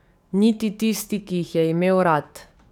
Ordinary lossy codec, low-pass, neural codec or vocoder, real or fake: none; 19.8 kHz; codec, 44.1 kHz, 7.8 kbps, DAC; fake